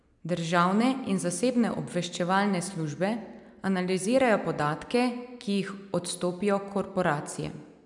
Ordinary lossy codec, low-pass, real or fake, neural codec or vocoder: MP3, 96 kbps; 10.8 kHz; real; none